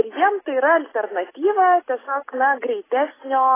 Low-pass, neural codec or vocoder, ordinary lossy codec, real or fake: 3.6 kHz; none; AAC, 16 kbps; real